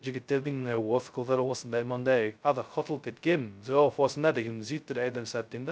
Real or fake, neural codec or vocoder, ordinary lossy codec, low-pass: fake; codec, 16 kHz, 0.2 kbps, FocalCodec; none; none